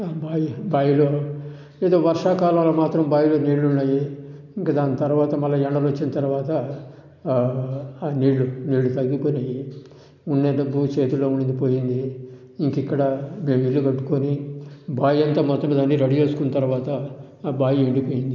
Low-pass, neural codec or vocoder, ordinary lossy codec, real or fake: 7.2 kHz; none; none; real